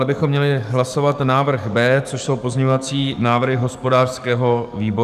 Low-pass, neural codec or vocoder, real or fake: 14.4 kHz; codec, 44.1 kHz, 7.8 kbps, DAC; fake